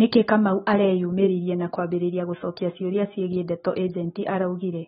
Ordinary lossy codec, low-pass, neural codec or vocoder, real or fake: AAC, 16 kbps; 19.8 kHz; none; real